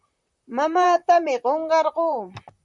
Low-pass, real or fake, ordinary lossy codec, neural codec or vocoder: 10.8 kHz; fake; MP3, 96 kbps; vocoder, 44.1 kHz, 128 mel bands, Pupu-Vocoder